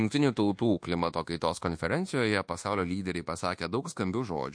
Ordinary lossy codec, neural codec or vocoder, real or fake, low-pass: MP3, 48 kbps; codec, 24 kHz, 1.2 kbps, DualCodec; fake; 9.9 kHz